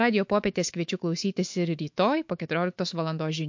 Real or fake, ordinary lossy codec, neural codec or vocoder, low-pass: fake; MP3, 48 kbps; autoencoder, 48 kHz, 128 numbers a frame, DAC-VAE, trained on Japanese speech; 7.2 kHz